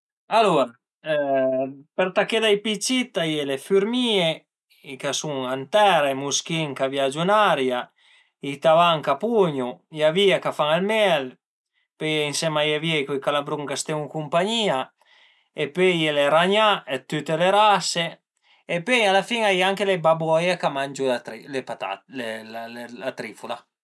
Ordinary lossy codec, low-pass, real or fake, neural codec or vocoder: none; none; real; none